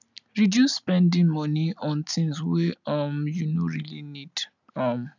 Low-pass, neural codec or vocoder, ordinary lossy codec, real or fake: 7.2 kHz; none; none; real